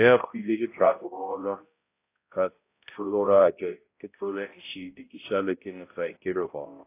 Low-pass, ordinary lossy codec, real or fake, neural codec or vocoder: 3.6 kHz; AAC, 24 kbps; fake; codec, 16 kHz, 0.5 kbps, X-Codec, HuBERT features, trained on balanced general audio